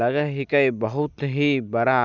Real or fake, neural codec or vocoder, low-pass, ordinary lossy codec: real; none; 7.2 kHz; none